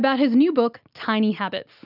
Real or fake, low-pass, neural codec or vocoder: real; 5.4 kHz; none